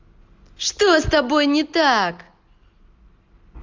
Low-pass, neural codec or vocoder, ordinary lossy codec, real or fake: 7.2 kHz; none; Opus, 32 kbps; real